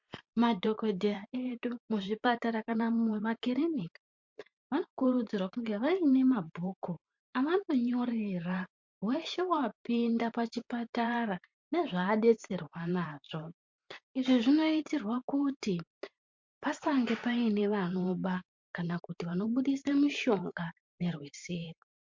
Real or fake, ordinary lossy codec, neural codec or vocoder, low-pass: fake; MP3, 48 kbps; vocoder, 44.1 kHz, 128 mel bands every 512 samples, BigVGAN v2; 7.2 kHz